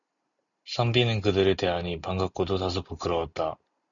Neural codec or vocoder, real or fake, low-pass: none; real; 7.2 kHz